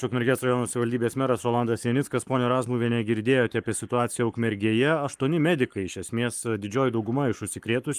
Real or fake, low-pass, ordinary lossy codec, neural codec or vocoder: fake; 14.4 kHz; Opus, 32 kbps; codec, 44.1 kHz, 7.8 kbps, Pupu-Codec